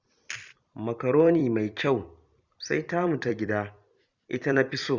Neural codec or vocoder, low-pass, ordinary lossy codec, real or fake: vocoder, 44.1 kHz, 128 mel bands every 256 samples, BigVGAN v2; 7.2 kHz; Opus, 64 kbps; fake